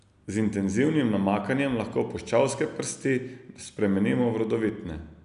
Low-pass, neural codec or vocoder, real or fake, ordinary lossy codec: 10.8 kHz; none; real; none